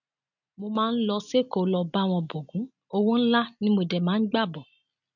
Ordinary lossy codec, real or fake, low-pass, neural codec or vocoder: none; real; 7.2 kHz; none